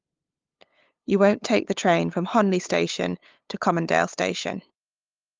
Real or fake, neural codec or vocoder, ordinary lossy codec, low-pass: fake; codec, 16 kHz, 8 kbps, FunCodec, trained on LibriTTS, 25 frames a second; Opus, 32 kbps; 7.2 kHz